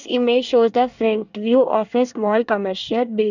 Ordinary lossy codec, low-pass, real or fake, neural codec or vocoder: none; 7.2 kHz; fake; codec, 24 kHz, 1 kbps, SNAC